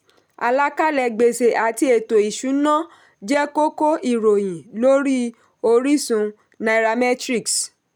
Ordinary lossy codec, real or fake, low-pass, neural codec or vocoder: none; real; none; none